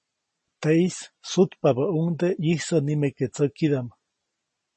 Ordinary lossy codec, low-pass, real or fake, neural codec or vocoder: MP3, 32 kbps; 10.8 kHz; real; none